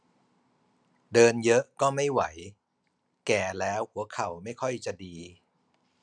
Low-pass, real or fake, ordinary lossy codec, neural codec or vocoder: 9.9 kHz; real; none; none